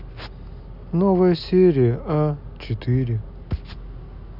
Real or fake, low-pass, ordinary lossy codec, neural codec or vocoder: real; 5.4 kHz; none; none